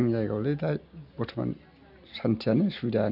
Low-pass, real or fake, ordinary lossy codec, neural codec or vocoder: 5.4 kHz; real; none; none